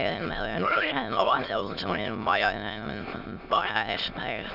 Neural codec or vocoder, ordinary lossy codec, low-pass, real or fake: autoencoder, 22.05 kHz, a latent of 192 numbers a frame, VITS, trained on many speakers; none; 5.4 kHz; fake